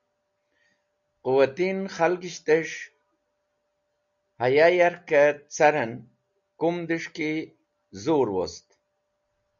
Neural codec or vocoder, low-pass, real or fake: none; 7.2 kHz; real